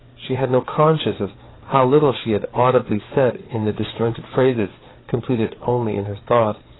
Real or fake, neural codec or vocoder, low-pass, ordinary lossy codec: fake; codec, 16 kHz, 4 kbps, FreqCodec, larger model; 7.2 kHz; AAC, 16 kbps